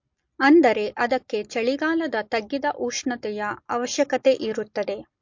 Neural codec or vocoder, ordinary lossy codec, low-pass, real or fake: none; MP3, 48 kbps; 7.2 kHz; real